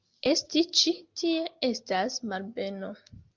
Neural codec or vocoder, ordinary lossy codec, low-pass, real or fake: none; Opus, 32 kbps; 7.2 kHz; real